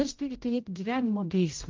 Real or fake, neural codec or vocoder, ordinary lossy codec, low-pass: fake; codec, 16 kHz, 0.5 kbps, X-Codec, HuBERT features, trained on general audio; Opus, 32 kbps; 7.2 kHz